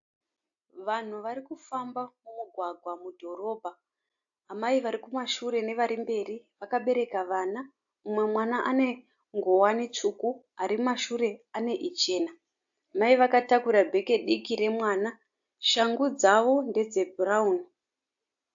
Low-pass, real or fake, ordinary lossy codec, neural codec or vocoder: 7.2 kHz; real; AAC, 64 kbps; none